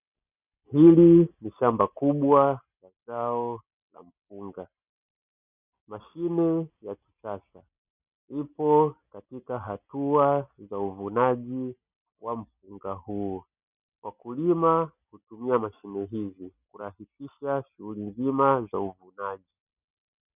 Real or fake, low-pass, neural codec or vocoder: real; 3.6 kHz; none